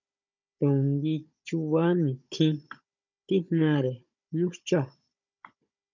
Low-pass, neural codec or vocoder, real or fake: 7.2 kHz; codec, 16 kHz, 16 kbps, FunCodec, trained on Chinese and English, 50 frames a second; fake